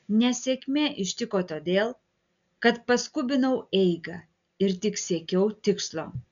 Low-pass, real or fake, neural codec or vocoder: 7.2 kHz; real; none